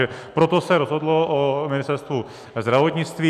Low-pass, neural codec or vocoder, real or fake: 14.4 kHz; none; real